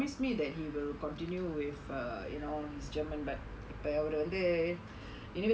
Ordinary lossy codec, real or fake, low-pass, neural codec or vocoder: none; real; none; none